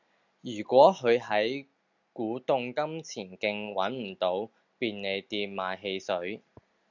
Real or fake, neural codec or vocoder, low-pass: real; none; 7.2 kHz